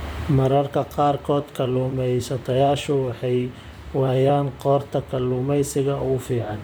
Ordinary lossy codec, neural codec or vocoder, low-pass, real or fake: none; vocoder, 44.1 kHz, 128 mel bands, Pupu-Vocoder; none; fake